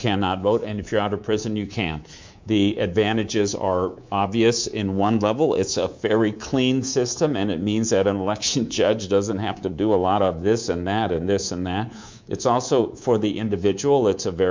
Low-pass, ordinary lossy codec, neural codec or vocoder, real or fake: 7.2 kHz; MP3, 64 kbps; codec, 24 kHz, 3.1 kbps, DualCodec; fake